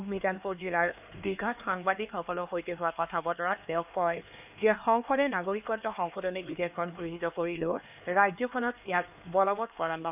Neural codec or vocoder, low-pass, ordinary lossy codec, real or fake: codec, 16 kHz, 2 kbps, X-Codec, HuBERT features, trained on LibriSpeech; 3.6 kHz; none; fake